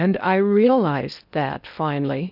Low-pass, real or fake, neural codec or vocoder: 5.4 kHz; fake; codec, 16 kHz in and 24 kHz out, 0.8 kbps, FocalCodec, streaming, 65536 codes